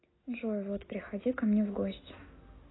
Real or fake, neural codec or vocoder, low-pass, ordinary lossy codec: real; none; 7.2 kHz; AAC, 16 kbps